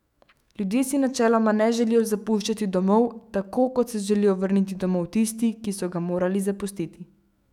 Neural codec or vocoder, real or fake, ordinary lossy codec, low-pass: autoencoder, 48 kHz, 128 numbers a frame, DAC-VAE, trained on Japanese speech; fake; none; 19.8 kHz